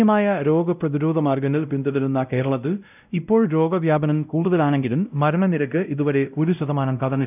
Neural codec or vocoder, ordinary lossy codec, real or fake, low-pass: codec, 16 kHz, 0.5 kbps, X-Codec, WavLM features, trained on Multilingual LibriSpeech; none; fake; 3.6 kHz